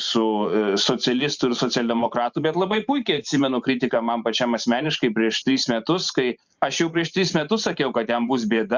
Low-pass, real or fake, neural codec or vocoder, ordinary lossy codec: 7.2 kHz; fake; vocoder, 44.1 kHz, 128 mel bands every 512 samples, BigVGAN v2; Opus, 64 kbps